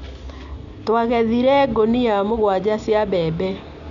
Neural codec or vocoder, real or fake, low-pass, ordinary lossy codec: none; real; 7.2 kHz; none